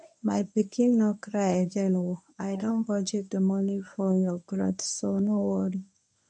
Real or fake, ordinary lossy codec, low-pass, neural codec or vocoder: fake; none; 10.8 kHz; codec, 24 kHz, 0.9 kbps, WavTokenizer, medium speech release version 1